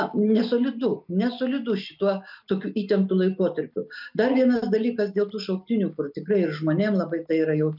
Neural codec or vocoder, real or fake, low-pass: none; real; 5.4 kHz